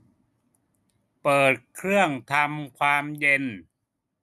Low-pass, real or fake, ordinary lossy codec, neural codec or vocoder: none; real; none; none